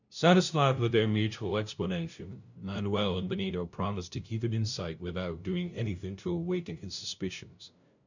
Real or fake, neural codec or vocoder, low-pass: fake; codec, 16 kHz, 0.5 kbps, FunCodec, trained on LibriTTS, 25 frames a second; 7.2 kHz